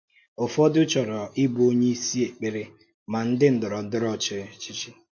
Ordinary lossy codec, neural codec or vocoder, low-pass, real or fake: MP3, 48 kbps; none; 7.2 kHz; real